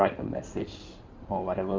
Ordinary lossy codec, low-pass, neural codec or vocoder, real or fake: Opus, 24 kbps; 7.2 kHz; codec, 16 kHz in and 24 kHz out, 2.2 kbps, FireRedTTS-2 codec; fake